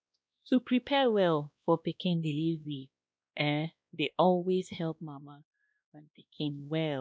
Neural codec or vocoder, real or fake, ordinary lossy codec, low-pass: codec, 16 kHz, 1 kbps, X-Codec, WavLM features, trained on Multilingual LibriSpeech; fake; none; none